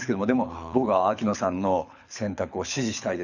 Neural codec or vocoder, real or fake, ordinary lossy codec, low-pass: codec, 24 kHz, 6 kbps, HILCodec; fake; none; 7.2 kHz